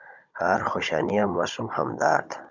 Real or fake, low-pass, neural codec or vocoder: fake; 7.2 kHz; codec, 16 kHz, 16 kbps, FunCodec, trained on Chinese and English, 50 frames a second